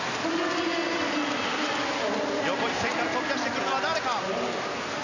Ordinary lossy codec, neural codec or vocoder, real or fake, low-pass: none; none; real; 7.2 kHz